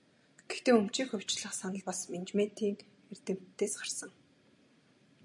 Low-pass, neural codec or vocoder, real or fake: 10.8 kHz; none; real